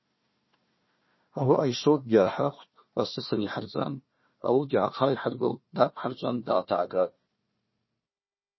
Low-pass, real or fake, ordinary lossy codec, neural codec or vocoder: 7.2 kHz; fake; MP3, 24 kbps; codec, 16 kHz, 1 kbps, FunCodec, trained on Chinese and English, 50 frames a second